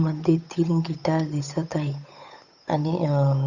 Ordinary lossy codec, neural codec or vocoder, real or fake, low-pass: none; codec, 16 kHz, 8 kbps, FunCodec, trained on Chinese and English, 25 frames a second; fake; 7.2 kHz